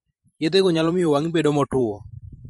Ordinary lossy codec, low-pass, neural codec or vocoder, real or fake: MP3, 48 kbps; 19.8 kHz; vocoder, 44.1 kHz, 128 mel bands every 512 samples, BigVGAN v2; fake